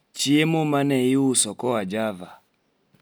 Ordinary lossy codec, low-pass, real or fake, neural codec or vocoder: none; none; real; none